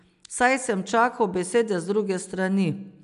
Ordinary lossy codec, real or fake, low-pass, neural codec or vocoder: none; real; 10.8 kHz; none